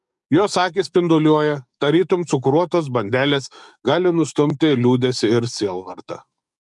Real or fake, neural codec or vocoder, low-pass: fake; codec, 44.1 kHz, 7.8 kbps, DAC; 10.8 kHz